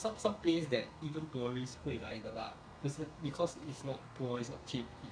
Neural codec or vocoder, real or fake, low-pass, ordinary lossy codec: codec, 32 kHz, 1.9 kbps, SNAC; fake; 9.9 kHz; none